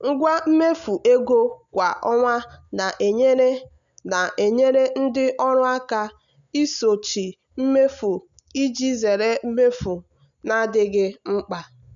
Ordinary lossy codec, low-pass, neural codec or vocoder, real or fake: none; 7.2 kHz; none; real